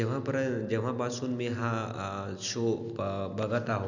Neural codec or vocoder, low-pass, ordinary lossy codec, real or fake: none; 7.2 kHz; none; real